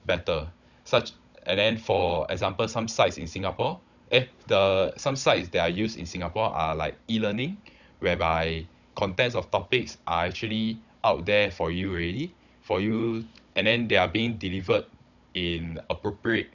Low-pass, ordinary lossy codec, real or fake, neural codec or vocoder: 7.2 kHz; none; fake; codec, 16 kHz, 16 kbps, FunCodec, trained on Chinese and English, 50 frames a second